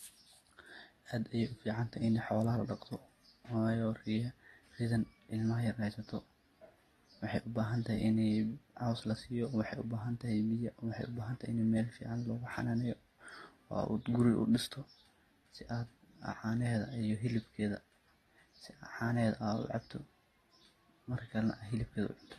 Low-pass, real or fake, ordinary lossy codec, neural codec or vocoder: 19.8 kHz; real; AAC, 32 kbps; none